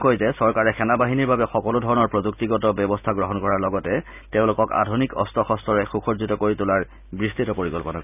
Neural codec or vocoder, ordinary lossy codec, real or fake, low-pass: none; none; real; 3.6 kHz